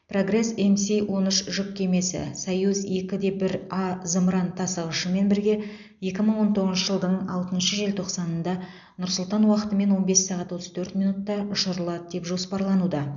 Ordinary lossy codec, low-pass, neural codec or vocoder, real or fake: none; 7.2 kHz; none; real